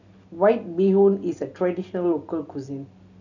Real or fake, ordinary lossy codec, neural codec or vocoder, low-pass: real; none; none; 7.2 kHz